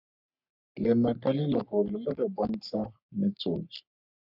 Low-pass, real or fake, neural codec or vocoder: 5.4 kHz; fake; codec, 44.1 kHz, 3.4 kbps, Pupu-Codec